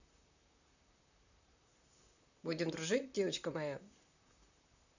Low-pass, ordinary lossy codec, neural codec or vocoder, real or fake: 7.2 kHz; none; vocoder, 44.1 kHz, 128 mel bands, Pupu-Vocoder; fake